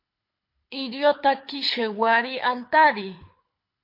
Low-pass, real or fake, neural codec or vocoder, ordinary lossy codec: 5.4 kHz; fake; codec, 24 kHz, 6 kbps, HILCodec; MP3, 32 kbps